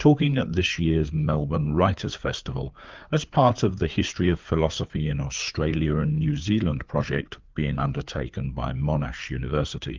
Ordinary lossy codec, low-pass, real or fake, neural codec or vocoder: Opus, 32 kbps; 7.2 kHz; fake; codec, 16 kHz, 4 kbps, FreqCodec, larger model